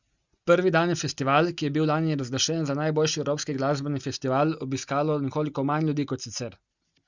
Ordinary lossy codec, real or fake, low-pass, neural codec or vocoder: Opus, 64 kbps; real; 7.2 kHz; none